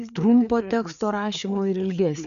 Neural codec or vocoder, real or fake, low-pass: codec, 16 kHz, 4 kbps, FreqCodec, larger model; fake; 7.2 kHz